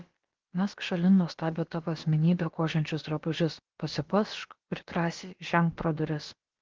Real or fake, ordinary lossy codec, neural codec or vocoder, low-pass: fake; Opus, 16 kbps; codec, 16 kHz, about 1 kbps, DyCAST, with the encoder's durations; 7.2 kHz